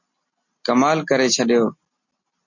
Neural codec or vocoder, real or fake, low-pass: none; real; 7.2 kHz